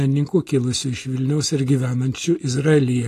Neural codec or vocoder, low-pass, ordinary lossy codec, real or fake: none; 14.4 kHz; AAC, 48 kbps; real